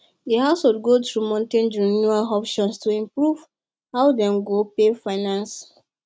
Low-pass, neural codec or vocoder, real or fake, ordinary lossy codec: none; none; real; none